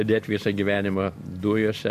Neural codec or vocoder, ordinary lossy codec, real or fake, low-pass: none; MP3, 64 kbps; real; 14.4 kHz